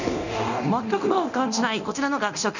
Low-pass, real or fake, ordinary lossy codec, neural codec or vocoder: 7.2 kHz; fake; none; codec, 24 kHz, 0.9 kbps, DualCodec